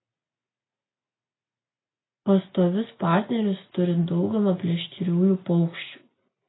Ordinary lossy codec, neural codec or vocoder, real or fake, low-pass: AAC, 16 kbps; none; real; 7.2 kHz